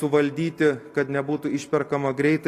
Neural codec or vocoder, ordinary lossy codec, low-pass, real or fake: none; AAC, 48 kbps; 14.4 kHz; real